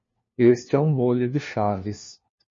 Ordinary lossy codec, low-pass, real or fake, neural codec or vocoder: MP3, 32 kbps; 7.2 kHz; fake; codec, 16 kHz, 1 kbps, FunCodec, trained on LibriTTS, 50 frames a second